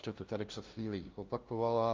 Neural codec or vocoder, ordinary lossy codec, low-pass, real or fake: codec, 16 kHz, 0.5 kbps, FunCodec, trained on LibriTTS, 25 frames a second; Opus, 24 kbps; 7.2 kHz; fake